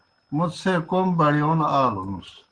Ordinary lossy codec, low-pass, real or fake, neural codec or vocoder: Opus, 16 kbps; 9.9 kHz; real; none